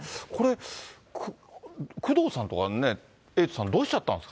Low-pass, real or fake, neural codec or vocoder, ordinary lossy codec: none; real; none; none